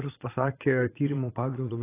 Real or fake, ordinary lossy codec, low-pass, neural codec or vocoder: fake; AAC, 16 kbps; 3.6 kHz; vocoder, 22.05 kHz, 80 mel bands, Vocos